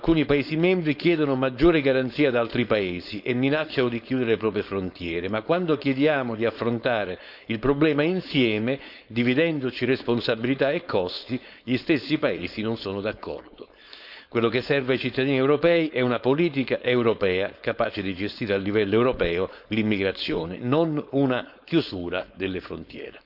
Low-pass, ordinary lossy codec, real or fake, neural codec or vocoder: 5.4 kHz; none; fake; codec, 16 kHz, 4.8 kbps, FACodec